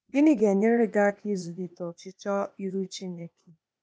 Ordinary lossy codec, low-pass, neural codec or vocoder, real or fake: none; none; codec, 16 kHz, 0.8 kbps, ZipCodec; fake